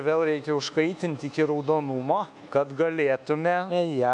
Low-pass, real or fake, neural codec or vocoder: 10.8 kHz; fake; codec, 24 kHz, 1.2 kbps, DualCodec